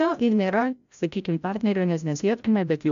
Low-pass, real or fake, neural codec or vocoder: 7.2 kHz; fake; codec, 16 kHz, 0.5 kbps, FreqCodec, larger model